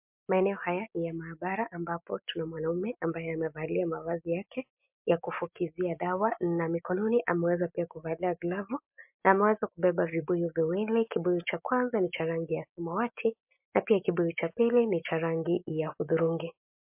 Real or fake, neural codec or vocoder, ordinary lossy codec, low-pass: real; none; MP3, 32 kbps; 3.6 kHz